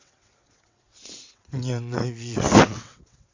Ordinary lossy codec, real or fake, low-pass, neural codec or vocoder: AAC, 48 kbps; fake; 7.2 kHz; vocoder, 22.05 kHz, 80 mel bands, WaveNeXt